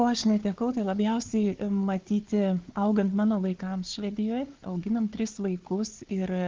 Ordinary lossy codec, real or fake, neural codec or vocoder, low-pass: Opus, 16 kbps; fake; codec, 16 kHz, 4 kbps, FunCodec, trained on Chinese and English, 50 frames a second; 7.2 kHz